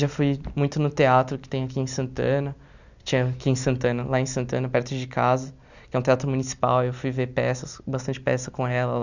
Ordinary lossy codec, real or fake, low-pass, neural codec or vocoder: none; real; 7.2 kHz; none